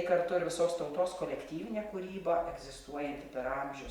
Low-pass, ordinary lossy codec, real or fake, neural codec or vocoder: 19.8 kHz; Opus, 64 kbps; fake; vocoder, 44.1 kHz, 128 mel bands every 512 samples, BigVGAN v2